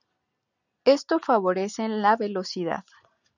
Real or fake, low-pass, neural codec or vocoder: real; 7.2 kHz; none